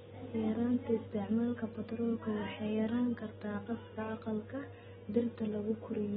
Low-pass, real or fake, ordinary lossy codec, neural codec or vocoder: 14.4 kHz; real; AAC, 16 kbps; none